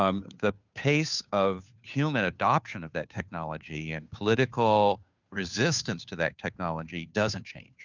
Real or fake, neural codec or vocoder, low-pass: fake; codec, 16 kHz, 2 kbps, FunCodec, trained on Chinese and English, 25 frames a second; 7.2 kHz